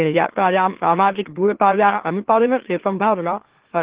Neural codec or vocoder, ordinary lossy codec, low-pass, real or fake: autoencoder, 44.1 kHz, a latent of 192 numbers a frame, MeloTTS; Opus, 16 kbps; 3.6 kHz; fake